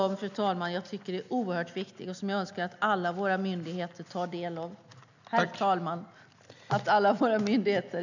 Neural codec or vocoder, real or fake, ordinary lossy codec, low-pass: none; real; none; 7.2 kHz